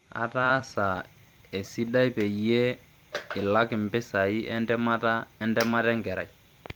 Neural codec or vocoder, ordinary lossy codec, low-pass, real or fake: vocoder, 44.1 kHz, 128 mel bands every 256 samples, BigVGAN v2; Opus, 32 kbps; 19.8 kHz; fake